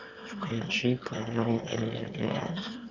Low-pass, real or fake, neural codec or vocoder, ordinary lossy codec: 7.2 kHz; fake; autoencoder, 22.05 kHz, a latent of 192 numbers a frame, VITS, trained on one speaker; none